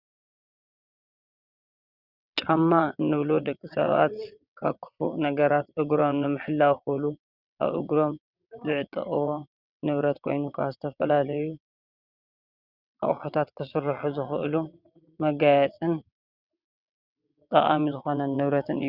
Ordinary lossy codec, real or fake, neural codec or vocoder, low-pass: Opus, 64 kbps; fake; vocoder, 22.05 kHz, 80 mel bands, WaveNeXt; 5.4 kHz